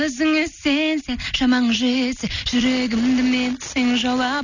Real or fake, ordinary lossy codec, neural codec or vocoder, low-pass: real; none; none; 7.2 kHz